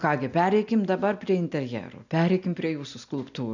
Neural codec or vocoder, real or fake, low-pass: none; real; 7.2 kHz